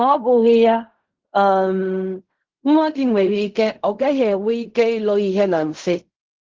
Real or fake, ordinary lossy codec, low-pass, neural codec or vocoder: fake; Opus, 16 kbps; 7.2 kHz; codec, 16 kHz in and 24 kHz out, 0.4 kbps, LongCat-Audio-Codec, fine tuned four codebook decoder